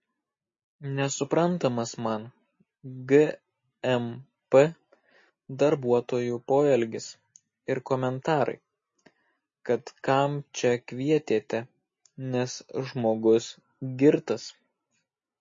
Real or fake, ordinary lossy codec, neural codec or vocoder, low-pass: real; MP3, 32 kbps; none; 7.2 kHz